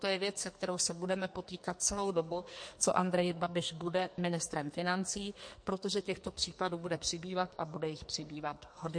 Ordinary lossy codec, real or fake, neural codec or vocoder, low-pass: MP3, 48 kbps; fake; codec, 44.1 kHz, 2.6 kbps, SNAC; 9.9 kHz